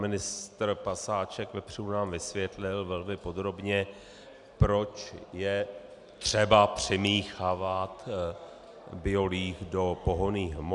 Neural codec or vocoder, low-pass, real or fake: none; 10.8 kHz; real